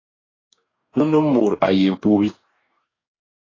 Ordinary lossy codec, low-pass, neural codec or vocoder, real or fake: AAC, 32 kbps; 7.2 kHz; codec, 44.1 kHz, 2.6 kbps, DAC; fake